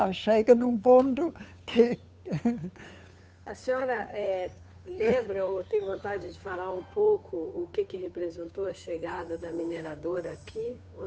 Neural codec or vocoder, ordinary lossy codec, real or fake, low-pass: codec, 16 kHz, 2 kbps, FunCodec, trained on Chinese and English, 25 frames a second; none; fake; none